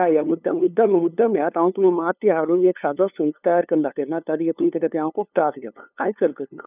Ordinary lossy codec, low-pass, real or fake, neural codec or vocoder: none; 3.6 kHz; fake; codec, 16 kHz, 2 kbps, FunCodec, trained on LibriTTS, 25 frames a second